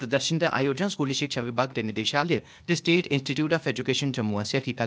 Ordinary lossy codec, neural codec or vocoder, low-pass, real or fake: none; codec, 16 kHz, 0.8 kbps, ZipCodec; none; fake